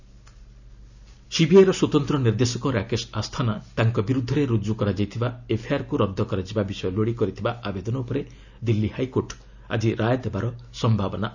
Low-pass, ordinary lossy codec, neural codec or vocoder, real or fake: 7.2 kHz; none; none; real